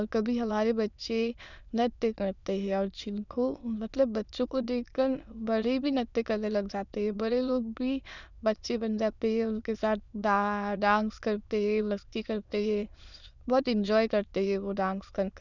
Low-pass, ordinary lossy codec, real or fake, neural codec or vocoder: 7.2 kHz; none; fake; autoencoder, 22.05 kHz, a latent of 192 numbers a frame, VITS, trained on many speakers